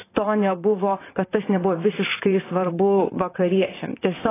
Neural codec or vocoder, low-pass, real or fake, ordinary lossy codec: vocoder, 44.1 kHz, 128 mel bands, Pupu-Vocoder; 3.6 kHz; fake; AAC, 16 kbps